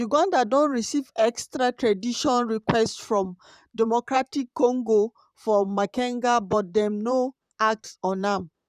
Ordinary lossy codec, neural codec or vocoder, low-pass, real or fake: none; vocoder, 44.1 kHz, 128 mel bands, Pupu-Vocoder; 14.4 kHz; fake